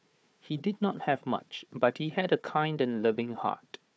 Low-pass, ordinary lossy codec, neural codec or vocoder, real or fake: none; none; codec, 16 kHz, 4 kbps, FunCodec, trained on Chinese and English, 50 frames a second; fake